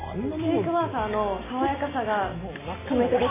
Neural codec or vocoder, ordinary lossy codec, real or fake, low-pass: none; MP3, 16 kbps; real; 3.6 kHz